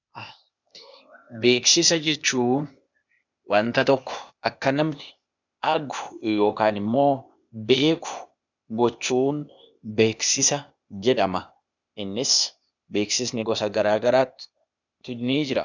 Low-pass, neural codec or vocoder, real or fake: 7.2 kHz; codec, 16 kHz, 0.8 kbps, ZipCodec; fake